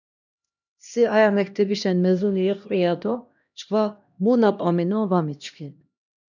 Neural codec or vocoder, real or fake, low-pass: codec, 16 kHz, 1 kbps, X-Codec, HuBERT features, trained on LibriSpeech; fake; 7.2 kHz